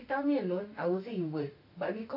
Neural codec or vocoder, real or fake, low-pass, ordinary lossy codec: autoencoder, 48 kHz, 32 numbers a frame, DAC-VAE, trained on Japanese speech; fake; 5.4 kHz; AAC, 48 kbps